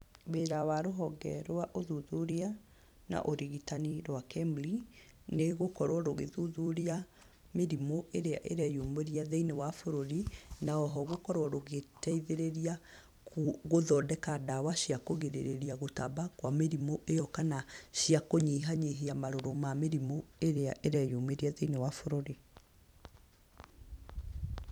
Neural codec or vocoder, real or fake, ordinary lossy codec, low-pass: vocoder, 44.1 kHz, 128 mel bands every 256 samples, BigVGAN v2; fake; none; 19.8 kHz